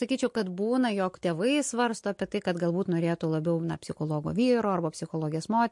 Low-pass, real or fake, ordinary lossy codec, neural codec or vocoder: 10.8 kHz; real; MP3, 48 kbps; none